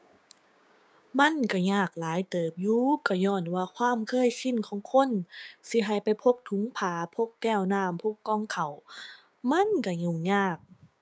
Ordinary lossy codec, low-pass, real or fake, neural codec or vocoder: none; none; fake; codec, 16 kHz, 6 kbps, DAC